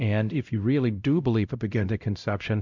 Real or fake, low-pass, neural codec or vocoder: fake; 7.2 kHz; codec, 16 kHz, 0.5 kbps, X-Codec, WavLM features, trained on Multilingual LibriSpeech